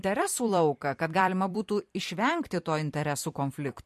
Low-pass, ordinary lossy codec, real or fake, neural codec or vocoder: 14.4 kHz; MP3, 64 kbps; fake; vocoder, 44.1 kHz, 128 mel bands every 256 samples, BigVGAN v2